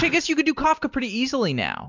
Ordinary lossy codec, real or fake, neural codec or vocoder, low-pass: MP3, 64 kbps; real; none; 7.2 kHz